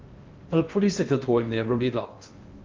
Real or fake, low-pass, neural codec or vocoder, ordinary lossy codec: fake; 7.2 kHz; codec, 16 kHz in and 24 kHz out, 0.6 kbps, FocalCodec, streaming, 4096 codes; Opus, 32 kbps